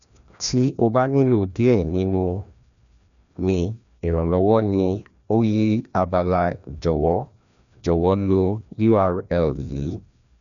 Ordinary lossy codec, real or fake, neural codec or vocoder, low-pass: none; fake; codec, 16 kHz, 1 kbps, FreqCodec, larger model; 7.2 kHz